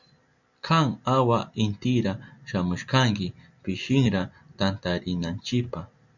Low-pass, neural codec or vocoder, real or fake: 7.2 kHz; none; real